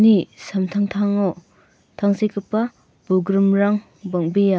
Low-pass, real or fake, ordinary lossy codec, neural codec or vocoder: none; real; none; none